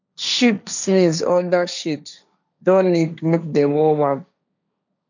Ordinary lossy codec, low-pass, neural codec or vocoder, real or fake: none; 7.2 kHz; codec, 16 kHz, 1.1 kbps, Voila-Tokenizer; fake